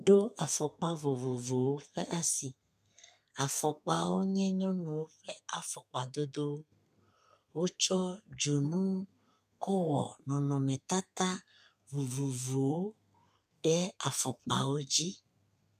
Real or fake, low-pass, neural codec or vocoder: fake; 14.4 kHz; codec, 32 kHz, 1.9 kbps, SNAC